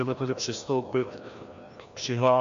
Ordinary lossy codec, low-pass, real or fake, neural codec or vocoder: MP3, 64 kbps; 7.2 kHz; fake; codec, 16 kHz, 1 kbps, FreqCodec, larger model